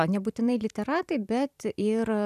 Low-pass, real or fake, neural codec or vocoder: 14.4 kHz; fake; vocoder, 44.1 kHz, 128 mel bands every 512 samples, BigVGAN v2